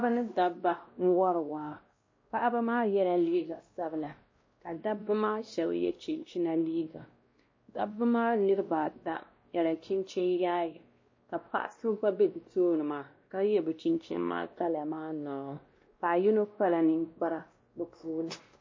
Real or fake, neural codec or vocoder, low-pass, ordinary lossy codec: fake; codec, 16 kHz, 1 kbps, X-Codec, WavLM features, trained on Multilingual LibriSpeech; 7.2 kHz; MP3, 32 kbps